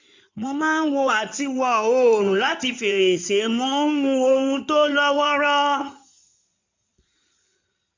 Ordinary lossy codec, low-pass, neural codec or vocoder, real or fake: MP3, 64 kbps; 7.2 kHz; codec, 16 kHz in and 24 kHz out, 2.2 kbps, FireRedTTS-2 codec; fake